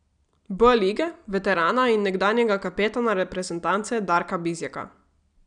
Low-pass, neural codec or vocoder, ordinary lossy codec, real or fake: 9.9 kHz; none; none; real